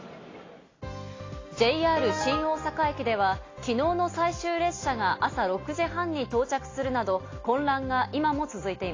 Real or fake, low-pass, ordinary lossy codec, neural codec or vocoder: real; 7.2 kHz; AAC, 32 kbps; none